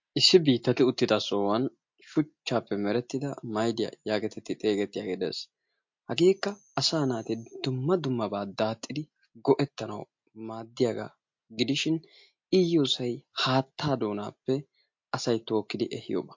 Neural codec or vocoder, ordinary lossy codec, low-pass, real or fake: none; MP3, 48 kbps; 7.2 kHz; real